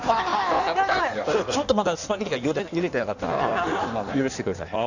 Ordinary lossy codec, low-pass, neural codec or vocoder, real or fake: none; 7.2 kHz; codec, 16 kHz in and 24 kHz out, 1.1 kbps, FireRedTTS-2 codec; fake